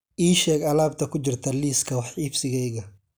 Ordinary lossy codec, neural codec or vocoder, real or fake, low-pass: none; none; real; none